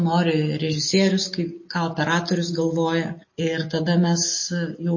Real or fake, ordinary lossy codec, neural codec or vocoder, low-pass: real; MP3, 32 kbps; none; 7.2 kHz